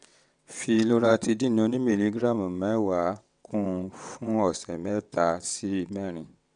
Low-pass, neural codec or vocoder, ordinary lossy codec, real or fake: 9.9 kHz; vocoder, 22.05 kHz, 80 mel bands, WaveNeXt; none; fake